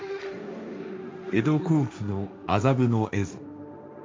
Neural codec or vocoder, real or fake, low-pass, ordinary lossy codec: codec, 16 kHz, 1.1 kbps, Voila-Tokenizer; fake; none; none